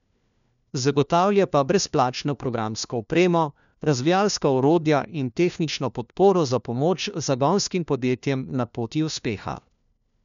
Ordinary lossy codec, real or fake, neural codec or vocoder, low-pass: none; fake; codec, 16 kHz, 1 kbps, FunCodec, trained on LibriTTS, 50 frames a second; 7.2 kHz